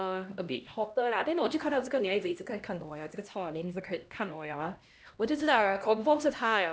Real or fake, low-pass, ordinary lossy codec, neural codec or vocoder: fake; none; none; codec, 16 kHz, 1 kbps, X-Codec, HuBERT features, trained on LibriSpeech